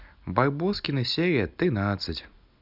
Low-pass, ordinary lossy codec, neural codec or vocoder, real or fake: 5.4 kHz; none; none; real